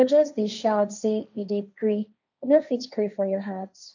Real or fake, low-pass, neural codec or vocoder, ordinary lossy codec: fake; 7.2 kHz; codec, 16 kHz, 1.1 kbps, Voila-Tokenizer; none